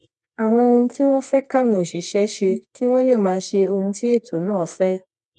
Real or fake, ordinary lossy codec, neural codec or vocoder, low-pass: fake; none; codec, 24 kHz, 0.9 kbps, WavTokenizer, medium music audio release; none